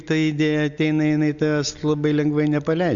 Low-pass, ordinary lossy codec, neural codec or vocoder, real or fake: 7.2 kHz; Opus, 64 kbps; none; real